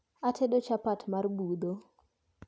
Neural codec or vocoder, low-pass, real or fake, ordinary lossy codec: none; none; real; none